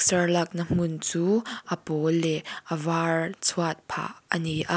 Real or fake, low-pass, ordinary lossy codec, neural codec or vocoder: real; none; none; none